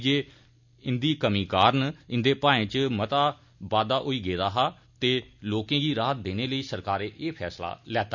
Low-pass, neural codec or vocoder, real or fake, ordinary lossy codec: 7.2 kHz; none; real; none